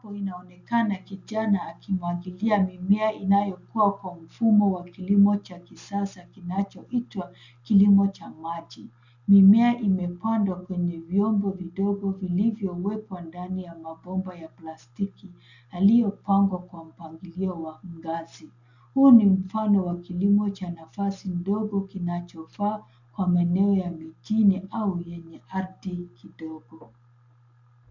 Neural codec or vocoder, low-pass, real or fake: none; 7.2 kHz; real